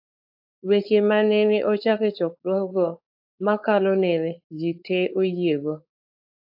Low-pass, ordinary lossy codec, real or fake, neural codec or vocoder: 5.4 kHz; AAC, 48 kbps; fake; codec, 16 kHz, 4.8 kbps, FACodec